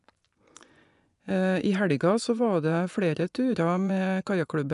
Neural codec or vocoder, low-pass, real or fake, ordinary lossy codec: vocoder, 24 kHz, 100 mel bands, Vocos; 10.8 kHz; fake; none